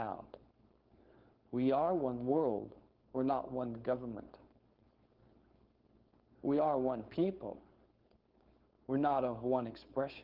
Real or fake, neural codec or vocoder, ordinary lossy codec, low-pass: fake; codec, 16 kHz, 4.8 kbps, FACodec; Opus, 16 kbps; 5.4 kHz